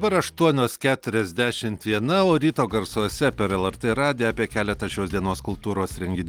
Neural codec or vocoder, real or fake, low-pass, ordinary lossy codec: none; real; 19.8 kHz; Opus, 24 kbps